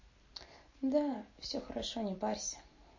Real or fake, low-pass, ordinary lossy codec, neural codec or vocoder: real; 7.2 kHz; MP3, 32 kbps; none